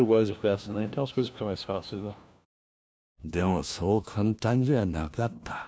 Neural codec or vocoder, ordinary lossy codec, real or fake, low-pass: codec, 16 kHz, 1 kbps, FunCodec, trained on LibriTTS, 50 frames a second; none; fake; none